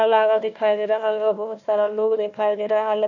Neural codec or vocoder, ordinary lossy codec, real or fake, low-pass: codec, 16 kHz, 1 kbps, FunCodec, trained on Chinese and English, 50 frames a second; none; fake; 7.2 kHz